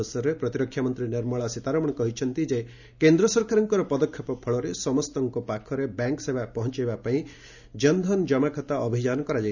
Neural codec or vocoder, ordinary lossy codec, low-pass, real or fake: none; none; 7.2 kHz; real